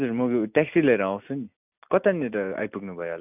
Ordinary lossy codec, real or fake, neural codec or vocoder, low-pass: none; real; none; 3.6 kHz